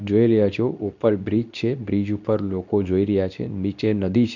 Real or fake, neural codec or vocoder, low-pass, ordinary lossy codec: fake; codec, 24 kHz, 0.9 kbps, WavTokenizer, medium speech release version 2; 7.2 kHz; none